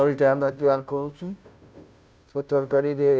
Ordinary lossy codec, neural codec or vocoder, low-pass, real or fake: none; codec, 16 kHz, 0.5 kbps, FunCodec, trained on Chinese and English, 25 frames a second; none; fake